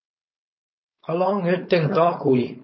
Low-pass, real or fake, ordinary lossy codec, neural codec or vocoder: 7.2 kHz; fake; MP3, 24 kbps; codec, 16 kHz, 4.8 kbps, FACodec